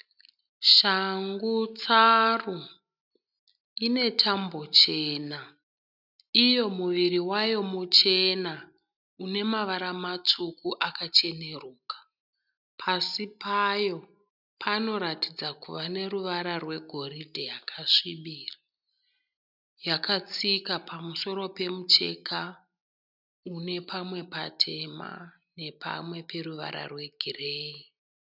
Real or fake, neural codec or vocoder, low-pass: real; none; 5.4 kHz